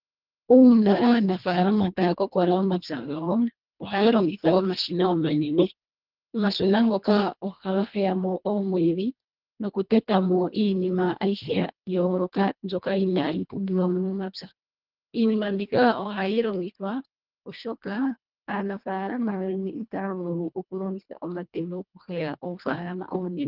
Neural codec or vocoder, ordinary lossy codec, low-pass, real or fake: codec, 24 kHz, 1.5 kbps, HILCodec; Opus, 32 kbps; 5.4 kHz; fake